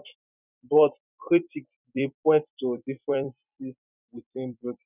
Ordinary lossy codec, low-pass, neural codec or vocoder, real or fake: none; 3.6 kHz; vocoder, 44.1 kHz, 128 mel bands every 256 samples, BigVGAN v2; fake